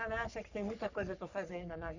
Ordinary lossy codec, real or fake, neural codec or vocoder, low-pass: none; fake; codec, 44.1 kHz, 3.4 kbps, Pupu-Codec; 7.2 kHz